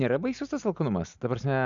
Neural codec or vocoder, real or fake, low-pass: none; real; 7.2 kHz